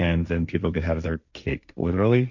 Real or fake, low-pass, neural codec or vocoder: fake; 7.2 kHz; codec, 16 kHz, 1.1 kbps, Voila-Tokenizer